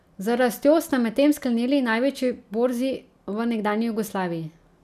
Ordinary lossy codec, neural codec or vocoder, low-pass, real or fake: none; none; 14.4 kHz; real